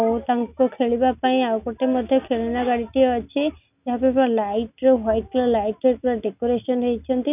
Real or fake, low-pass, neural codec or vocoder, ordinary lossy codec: real; 3.6 kHz; none; none